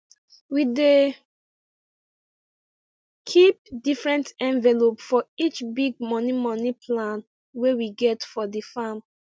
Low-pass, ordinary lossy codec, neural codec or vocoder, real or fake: none; none; none; real